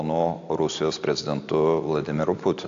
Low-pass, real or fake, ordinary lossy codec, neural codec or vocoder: 7.2 kHz; real; AAC, 64 kbps; none